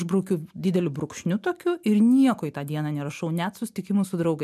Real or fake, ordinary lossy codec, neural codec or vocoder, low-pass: real; MP3, 96 kbps; none; 14.4 kHz